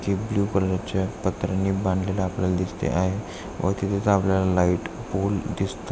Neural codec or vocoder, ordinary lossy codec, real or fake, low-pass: none; none; real; none